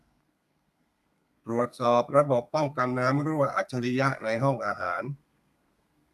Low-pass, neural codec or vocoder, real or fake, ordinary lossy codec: 14.4 kHz; codec, 32 kHz, 1.9 kbps, SNAC; fake; none